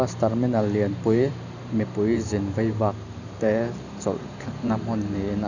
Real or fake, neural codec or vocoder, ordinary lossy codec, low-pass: fake; vocoder, 44.1 kHz, 128 mel bands every 512 samples, BigVGAN v2; none; 7.2 kHz